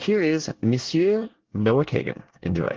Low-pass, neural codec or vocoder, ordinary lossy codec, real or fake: 7.2 kHz; codec, 24 kHz, 1 kbps, SNAC; Opus, 16 kbps; fake